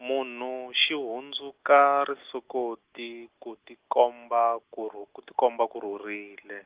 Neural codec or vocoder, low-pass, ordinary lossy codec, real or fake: none; 3.6 kHz; Opus, 16 kbps; real